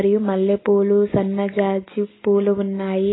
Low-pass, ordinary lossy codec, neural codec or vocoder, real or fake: 7.2 kHz; AAC, 16 kbps; none; real